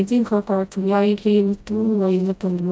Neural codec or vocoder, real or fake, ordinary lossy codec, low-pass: codec, 16 kHz, 0.5 kbps, FreqCodec, smaller model; fake; none; none